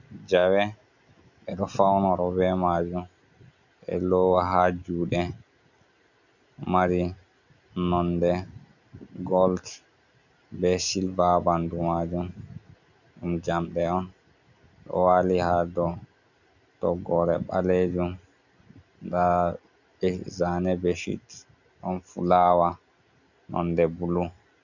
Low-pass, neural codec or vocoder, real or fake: 7.2 kHz; none; real